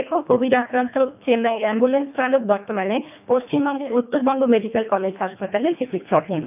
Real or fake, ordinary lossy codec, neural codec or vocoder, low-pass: fake; none; codec, 24 kHz, 1.5 kbps, HILCodec; 3.6 kHz